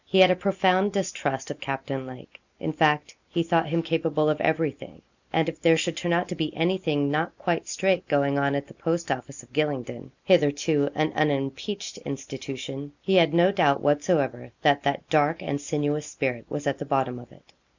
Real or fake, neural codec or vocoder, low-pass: real; none; 7.2 kHz